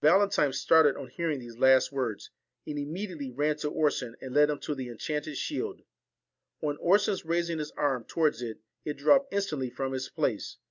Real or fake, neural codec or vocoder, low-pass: real; none; 7.2 kHz